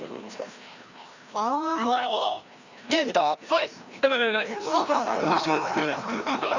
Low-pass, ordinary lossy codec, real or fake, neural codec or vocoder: 7.2 kHz; Opus, 64 kbps; fake; codec, 16 kHz, 1 kbps, FreqCodec, larger model